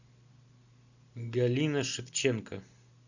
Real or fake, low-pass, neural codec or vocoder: real; 7.2 kHz; none